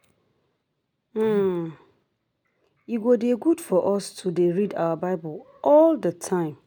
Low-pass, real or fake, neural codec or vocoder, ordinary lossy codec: none; real; none; none